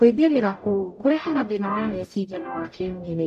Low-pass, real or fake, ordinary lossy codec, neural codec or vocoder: 14.4 kHz; fake; none; codec, 44.1 kHz, 0.9 kbps, DAC